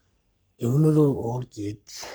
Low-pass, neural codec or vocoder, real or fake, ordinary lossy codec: none; codec, 44.1 kHz, 3.4 kbps, Pupu-Codec; fake; none